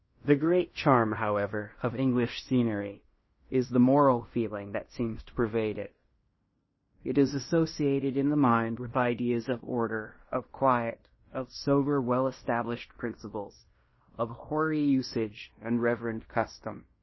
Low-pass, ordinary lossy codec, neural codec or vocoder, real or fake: 7.2 kHz; MP3, 24 kbps; codec, 16 kHz in and 24 kHz out, 0.9 kbps, LongCat-Audio-Codec, fine tuned four codebook decoder; fake